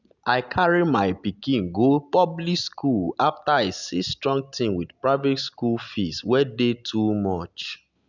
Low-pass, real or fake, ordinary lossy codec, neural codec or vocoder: 7.2 kHz; real; none; none